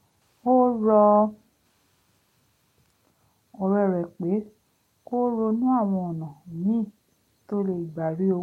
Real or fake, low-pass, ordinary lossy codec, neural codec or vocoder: real; 19.8 kHz; MP3, 64 kbps; none